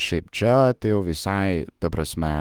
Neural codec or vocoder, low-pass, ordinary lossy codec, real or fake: autoencoder, 48 kHz, 32 numbers a frame, DAC-VAE, trained on Japanese speech; 19.8 kHz; Opus, 24 kbps; fake